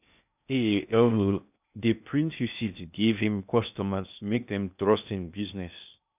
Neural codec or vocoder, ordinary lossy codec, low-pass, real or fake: codec, 16 kHz in and 24 kHz out, 0.6 kbps, FocalCodec, streaming, 2048 codes; none; 3.6 kHz; fake